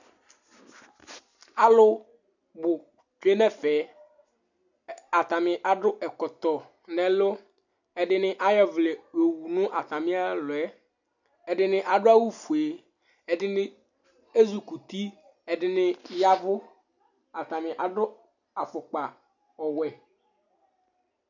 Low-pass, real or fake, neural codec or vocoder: 7.2 kHz; real; none